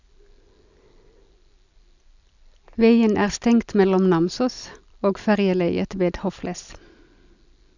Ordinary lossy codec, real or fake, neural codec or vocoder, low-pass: none; real; none; 7.2 kHz